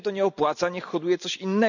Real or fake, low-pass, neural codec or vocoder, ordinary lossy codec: real; 7.2 kHz; none; none